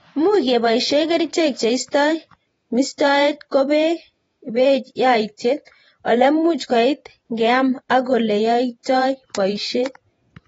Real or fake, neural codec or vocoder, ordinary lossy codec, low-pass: fake; vocoder, 48 kHz, 128 mel bands, Vocos; AAC, 24 kbps; 19.8 kHz